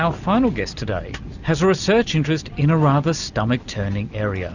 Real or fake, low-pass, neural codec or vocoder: real; 7.2 kHz; none